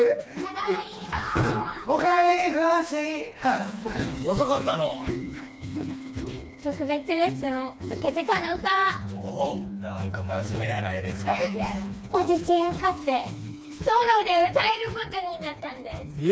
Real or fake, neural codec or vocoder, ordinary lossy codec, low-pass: fake; codec, 16 kHz, 2 kbps, FreqCodec, smaller model; none; none